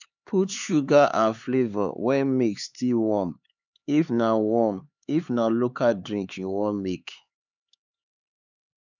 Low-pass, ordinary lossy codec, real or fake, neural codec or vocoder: 7.2 kHz; none; fake; codec, 16 kHz, 4 kbps, X-Codec, HuBERT features, trained on LibriSpeech